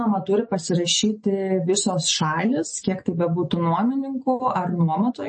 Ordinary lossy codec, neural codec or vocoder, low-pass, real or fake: MP3, 32 kbps; none; 10.8 kHz; real